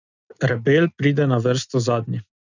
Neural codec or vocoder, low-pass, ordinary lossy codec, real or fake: none; 7.2 kHz; none; real